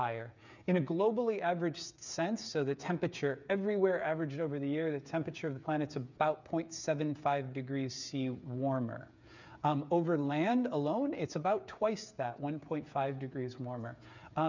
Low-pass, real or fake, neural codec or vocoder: 7.2 kHz; fake; codec, 16 kHz, 8 kbps, FreqCodec, smaller model